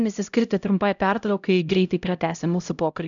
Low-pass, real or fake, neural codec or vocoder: 7.2 kHz; fake; codec, 16 kHz, 0.5 kbps, X-Codec, HuBERT features, trained on LibriSpeech